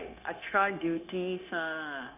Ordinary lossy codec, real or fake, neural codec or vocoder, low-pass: none; fake; codec, 16 kHz, 0.9 kbps, LongCat-Audio-Codec; 3.6 kHz